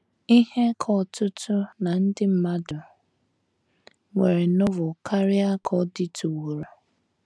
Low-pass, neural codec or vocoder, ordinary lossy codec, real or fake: none; none; none; real